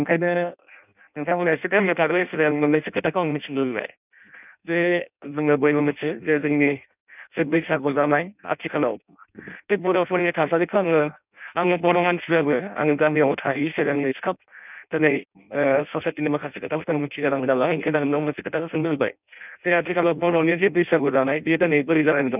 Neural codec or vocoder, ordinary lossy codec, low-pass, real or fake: codec, 16 kHz in and 24 kHz out, 0.6 kbps, FireRedTTS-2 codec; none; 3.6 kHz; fake